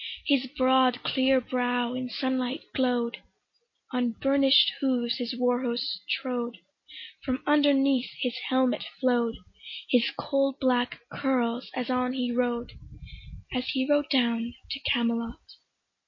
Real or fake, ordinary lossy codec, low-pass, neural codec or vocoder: real; MP3, 32 kbps; 7.2 kHz; none